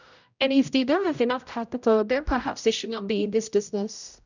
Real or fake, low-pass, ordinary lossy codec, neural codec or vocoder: fake; 7.2 kHz; none; codec, 16 kHz, 0.5 kbps, X-Codec, HuBERT features, trained on general audio